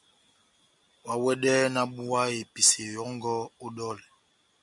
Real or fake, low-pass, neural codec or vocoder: real; 10.8 kHz; none